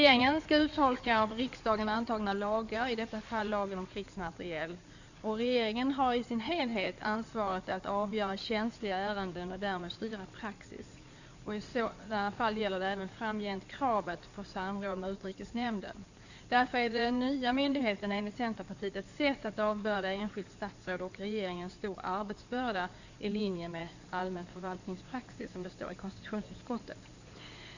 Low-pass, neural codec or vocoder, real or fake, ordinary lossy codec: 7.2 kHz; codec, 16 kHz in and 24 kHz out, 2.2 kbps, FireRedTTS-2 codec; fake; none